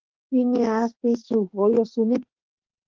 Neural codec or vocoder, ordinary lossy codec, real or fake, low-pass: autoencoder, 48 kHz, 32 numbers a frame, DAC-VAE, trained on Japanese speech; Opus, 16 kbps; fake; 7.2 kHz